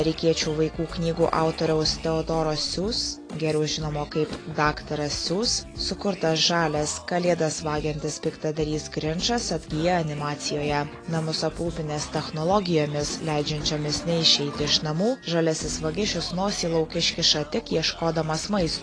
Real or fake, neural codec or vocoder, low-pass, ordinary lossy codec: real; none; 9.9 kHz; AAC, 32 kbps